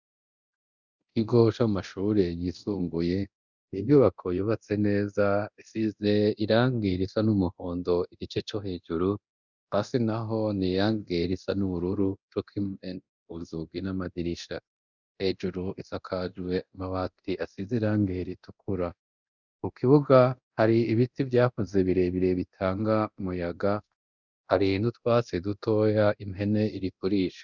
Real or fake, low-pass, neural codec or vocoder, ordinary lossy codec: fake; 7.2 kHz; codec, 24 kHz, 0.9 kbps, DualCodec; Opus, 64 kbps